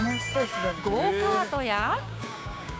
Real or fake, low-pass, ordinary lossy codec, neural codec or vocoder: fake; none; none; codec, 16 kHz, 6 kbps, DAC